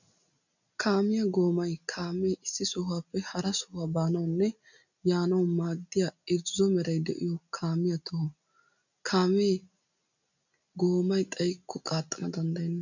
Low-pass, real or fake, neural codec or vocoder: 7.2 kHz; real; none